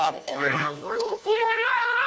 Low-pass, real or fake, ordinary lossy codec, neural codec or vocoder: none; fake; none; codec, 16 kHz, 1 kbps, FunCodec, trained on LibriTTS, 50 frames a second